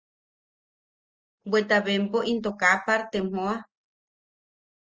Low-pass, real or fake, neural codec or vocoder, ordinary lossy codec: 7.2 kHz; real; none; Opus, 24 kbps